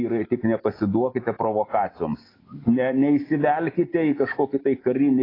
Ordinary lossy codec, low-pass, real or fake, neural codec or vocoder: AAC, 24 kbps; 5.4 kHz; real; none